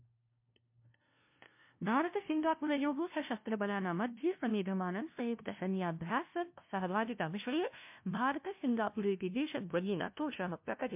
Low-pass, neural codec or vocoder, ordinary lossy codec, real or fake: 3.6 kHz; codec, 16 kHz, 0.5 kbps, FunCodec, trained on LibriTTS, 25 frames a second; MP3, 32 kbps; fake